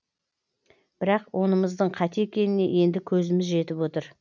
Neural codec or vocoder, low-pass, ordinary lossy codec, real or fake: none; 7.2 kHz; none; real